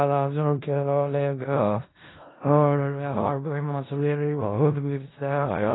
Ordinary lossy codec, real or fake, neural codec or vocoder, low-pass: AAC, 16 kbps; fake; codec, 16 kHz in and 24 kHz out, 0.4 kbps, LongCat-Audio-Codec, four codebook decoder; 7.2 kHz